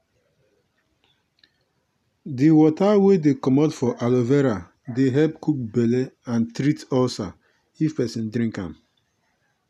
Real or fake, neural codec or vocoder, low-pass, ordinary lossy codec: real; none; 14.4 kHz; none